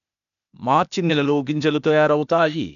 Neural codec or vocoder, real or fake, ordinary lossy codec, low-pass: codec, 16 kHz, 0.8 kbps, ZipCodec; fake; none; 7.2 kHz